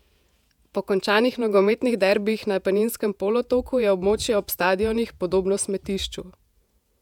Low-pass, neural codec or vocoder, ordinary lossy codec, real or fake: 19.8 kHz; vocoder, 48 kHz, 128 mel bands, Vocos; none; fake